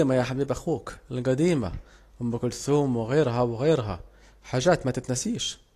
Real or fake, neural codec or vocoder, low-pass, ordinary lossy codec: real; none; 14.4 kHz; AAC, 48 kbps